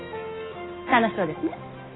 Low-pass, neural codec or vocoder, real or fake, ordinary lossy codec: 7.2 kHz; none; real; AAC, 16 kbps